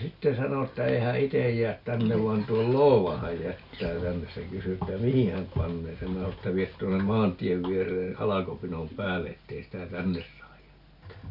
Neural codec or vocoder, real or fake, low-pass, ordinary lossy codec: none; real; 5.4 kHz; none